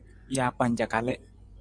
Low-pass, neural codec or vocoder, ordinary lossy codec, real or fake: 9.9 kHz; vocoder, 24 kHz, 100 mel bands, Vocos; MP3, 96 kbps; fake